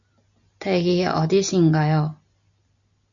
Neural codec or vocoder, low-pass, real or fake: none; 7.2 kHz; real